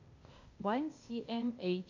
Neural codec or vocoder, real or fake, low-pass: codec, 16 kHz, 0.8 kbps, ZipCodec; fake; 7.2 kHz